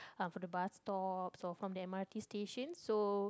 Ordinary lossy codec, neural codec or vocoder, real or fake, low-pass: none; none; real; none